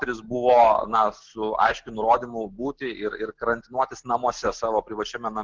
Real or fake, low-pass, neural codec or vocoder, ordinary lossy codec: real; 7.2 kHz; none; Opus, 16 kbps